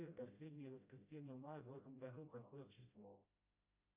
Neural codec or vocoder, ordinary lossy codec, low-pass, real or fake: codec, 16 kHz, 0.5 kbps, FreqCodec, smaller model; Opus, 64 kbps; 3.6 kHz; fake